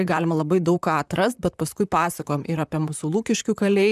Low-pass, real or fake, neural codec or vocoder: 14.4 kHz; fake; vocoder, 44.1 kHz, 128 mel bands, Pupu-Vocoder